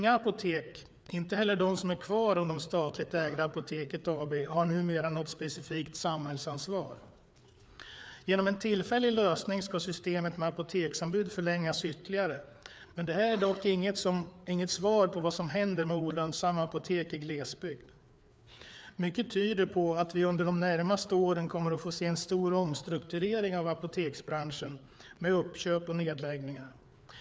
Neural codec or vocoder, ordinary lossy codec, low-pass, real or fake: codec, 16 kHz, 4 kbps, FreqCodec, larger model; none; none; fake